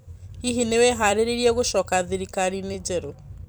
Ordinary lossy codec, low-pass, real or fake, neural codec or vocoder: none; none; fake; vocoder, 44.1 kHz, 128 mel bands every 256 samples, BigVGAN v2